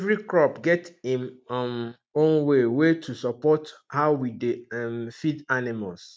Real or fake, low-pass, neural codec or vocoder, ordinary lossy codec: real; none; none; none